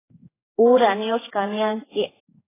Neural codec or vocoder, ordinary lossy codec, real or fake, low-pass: codec, 16 kHz, 4 kbps, X-Codec, HuBERT features, trained on general audio; AAC, 16 kbps; fake; 3.6 kHz